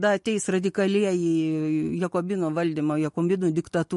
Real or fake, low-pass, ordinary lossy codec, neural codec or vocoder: real; 14.4 kHz; MP3, 48 kbps; none